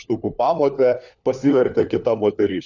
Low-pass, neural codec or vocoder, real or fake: 7.2 kHz; codec, 16 kHz, 4 kbps, FunCodec, trained on LibriTTS, 50 frames a second; fake